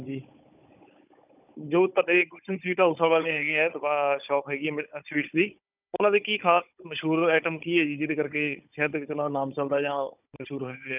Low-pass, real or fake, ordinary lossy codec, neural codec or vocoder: 3.6 kHz; fake; none; codec, 16 kHz, 16 kbps, FunCodec, trained on Chinese and English, 50 frames a second